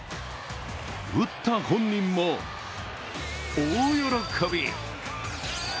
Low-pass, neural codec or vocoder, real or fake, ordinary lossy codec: none; none; real; none